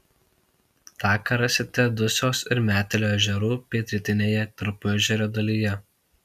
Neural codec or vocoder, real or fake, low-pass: none; real; 14.4 kHz